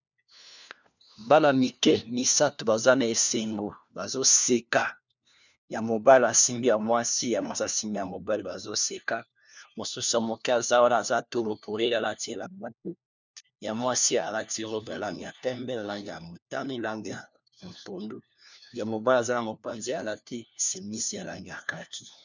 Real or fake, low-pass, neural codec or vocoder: fake; 7.2 kHz; codec, 16 kHz, 1 kbps, FunCodec, trained on LibriTTS, 50 frames a second